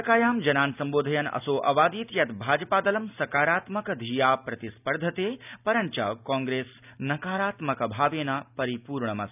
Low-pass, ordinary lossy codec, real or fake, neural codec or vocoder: 3.6 kHz; none; real; none